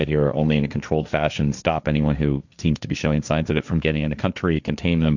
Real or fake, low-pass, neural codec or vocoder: fake; 7.2 kHz; codec, 16 kHz, 1.1 kbps, Voila-Tokenizer